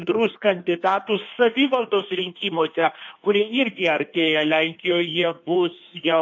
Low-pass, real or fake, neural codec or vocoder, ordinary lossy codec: 7.2 kHz; fake; codec, 16 kHz in and 24 kHz out, 1.1 kbps, FireRedTTS-2 codec; AAC, 48 kbps